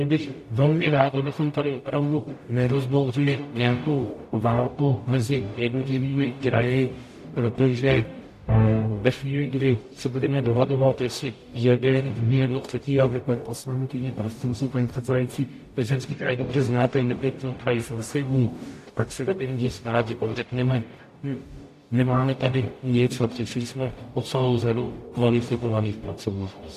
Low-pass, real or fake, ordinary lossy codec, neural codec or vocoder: 14.4 kHz; fake; AAC, 64 kbps; codec, 44.1 kHz, 0.9 kbps, DAC